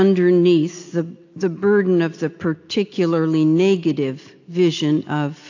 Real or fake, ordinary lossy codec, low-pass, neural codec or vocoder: real; AAC, 48 kbps; 7.2 kHz; none